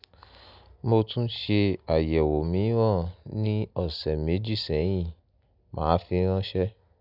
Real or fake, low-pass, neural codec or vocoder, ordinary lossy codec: real; 5.4 kHz; none; none